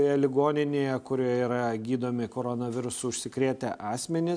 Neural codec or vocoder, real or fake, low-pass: none; real; 9.9 kHz